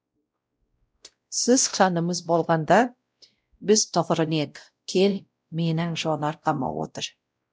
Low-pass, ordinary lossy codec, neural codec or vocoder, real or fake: none; none; codec, 16 kHz, 0.5 kbps, X-Codec, WavLM features, trained on Multilingual LibriSpeech; fake